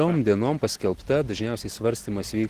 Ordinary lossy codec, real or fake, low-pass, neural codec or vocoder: Opus, 16 kbps; real; 14.4 kHz; none